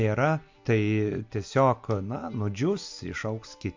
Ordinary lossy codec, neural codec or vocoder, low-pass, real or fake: MP3, 64 kbps; none; 7.2 kHz; real